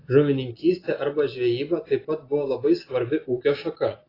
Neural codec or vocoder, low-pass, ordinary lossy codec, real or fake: none; 5.4 kHz; AAC, 24 kbps; real